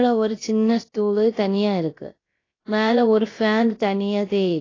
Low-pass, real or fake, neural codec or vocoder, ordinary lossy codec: 7.2 kHz; fake; codec, 16 kHz, about 1 kbps, DyCAST, with the encoder's durations; AAC, 32 kbps